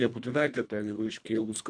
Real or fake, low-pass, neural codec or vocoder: fake; 9.9 kHz; codec, 24 kHz, 1.5 kbps, HILCodec